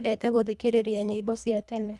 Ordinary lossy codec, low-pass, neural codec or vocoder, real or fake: none; none; codec, 24 kHz, 1.5 kbps, HILCodec; fake